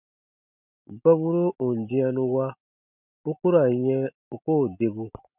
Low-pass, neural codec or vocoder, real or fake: 3.6 kHz; none; real